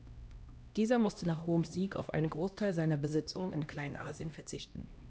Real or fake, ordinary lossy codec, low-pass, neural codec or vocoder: fake; none; none; codec, 16 kHz, 1 kbps, X-Codec, HuBERT features, trained on LibriSpeech